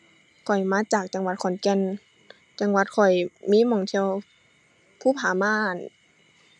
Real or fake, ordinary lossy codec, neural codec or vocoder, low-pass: real; none; none; none